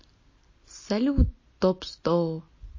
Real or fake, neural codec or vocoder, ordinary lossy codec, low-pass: real; none; MP3, 32 kbps; 7.2 kHz